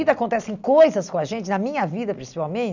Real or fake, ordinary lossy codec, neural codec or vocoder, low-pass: real; none; none; 7.2 kHz